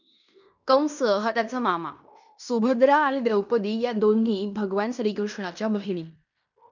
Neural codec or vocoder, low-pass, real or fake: codec, 16 kHz in and 24 kHz out, 0.9 kbps, LongCat-Audio-Codec, fine tuned four codebook decoder; 7.2 kHz; fake